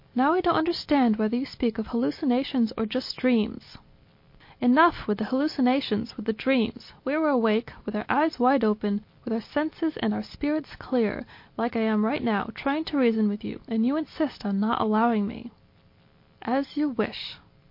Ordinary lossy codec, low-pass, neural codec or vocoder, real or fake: MP3, 32 kbps; 5.4 kHz; none; real